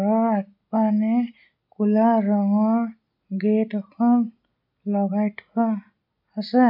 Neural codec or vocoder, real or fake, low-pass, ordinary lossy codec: none; real; 5.4 kHz; none